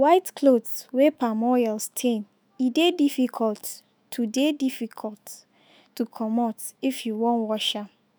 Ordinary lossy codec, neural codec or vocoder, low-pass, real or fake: none; autoencoder, 48 kHz, 128 numbers a frame, DAC-VAE, trained on Japanese speech; none; fake